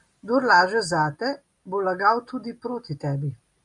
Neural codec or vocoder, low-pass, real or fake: none; 10.8 kHz; real